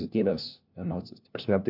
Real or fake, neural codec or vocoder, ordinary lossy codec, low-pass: fake; codec, 16 kHz, 1 kbps, FunCodec, trained on LibriTTS, 50 frames a second; AAC, 48 kbps; 5.4 kHz